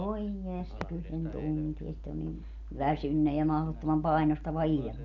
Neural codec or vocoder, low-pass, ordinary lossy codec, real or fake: none; 7.2 kHz; none; real